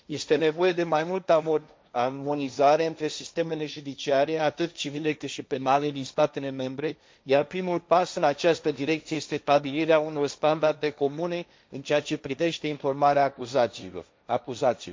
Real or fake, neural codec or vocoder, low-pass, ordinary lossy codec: fake; codec, 16 kHz, 1.1 kbps, Voila-Tokenizer; none; none